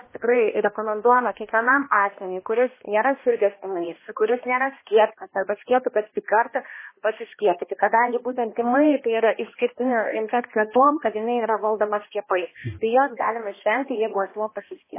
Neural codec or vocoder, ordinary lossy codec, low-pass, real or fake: codec, 16 kHz, 1 kbps, X-Codec, HuBERT features, trained on balanced general audio; MP3, 16 kbps; 3.6 kHz; fake